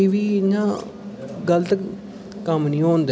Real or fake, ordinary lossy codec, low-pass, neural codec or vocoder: real; none; none; none